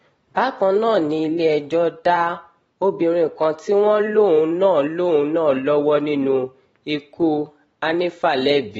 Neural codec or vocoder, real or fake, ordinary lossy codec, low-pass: vocoder, 44.1 kHz, 128 mel bands every 512 samples, BigVGAN v2; fake; AAC, 24 kbps; 19.8 kHz